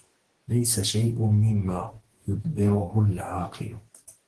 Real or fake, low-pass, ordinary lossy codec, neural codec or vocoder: fake; 10.8 kHz; Opus, 16 kbps; codec, 44.1 kHz, 2.6 kbps, DAC